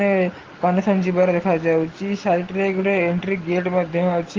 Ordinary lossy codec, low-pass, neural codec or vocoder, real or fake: Opus, 16 kbps; 7.2 kHz; none; real